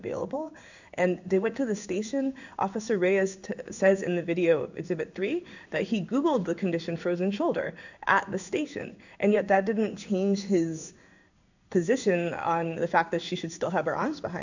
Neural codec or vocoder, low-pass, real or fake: codec, 16 kHz in and 24 kHz out, 1 kbps, XY-Tokenizer; 7.2 kHz; fake